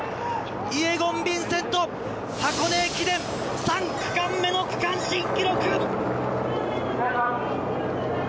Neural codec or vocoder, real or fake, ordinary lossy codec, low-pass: none; real; none; none